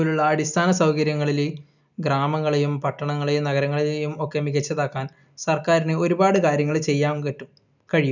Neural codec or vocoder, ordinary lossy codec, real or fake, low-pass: none; none; real; 7.2 kHz